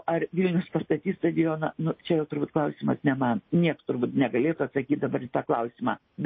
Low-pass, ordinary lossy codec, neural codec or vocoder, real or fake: 7.2 kHz; MP3, 24 kbps; none; real